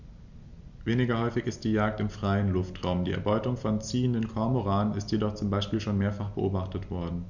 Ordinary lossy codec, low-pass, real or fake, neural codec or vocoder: none; 7.2 kHz; real; none